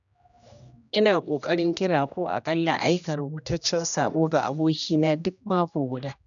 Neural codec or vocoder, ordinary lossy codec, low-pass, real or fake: codec, 16 kHz, 1 kbps, X-Codec, HuBERT features, trained on general audio; none; 7.2 kHz; fake